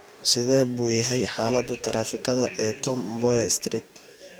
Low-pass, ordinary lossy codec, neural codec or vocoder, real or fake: none; none; codec, 44.1 kHz, 2.6 kbps, DAC; fake